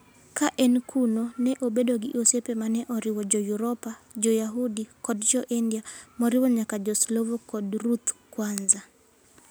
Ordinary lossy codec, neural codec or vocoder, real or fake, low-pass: none; none; real; none